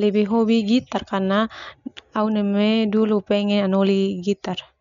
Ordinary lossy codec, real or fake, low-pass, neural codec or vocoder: MP3, 64 kbps; real; 7.2 kHz; none